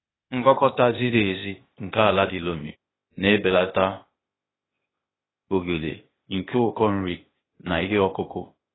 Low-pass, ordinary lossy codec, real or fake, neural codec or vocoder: 7.2 kHz; AAC, 16 kbps; fake; codec, 16 kHz, 0.8 kbps, ZipCodec